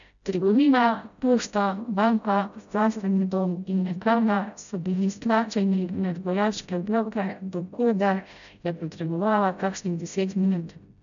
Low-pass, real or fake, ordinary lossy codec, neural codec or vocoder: 7.2 kHz; fake; none; codec, 16 kHz, 0.5 kbps, FreqCodec, smaller model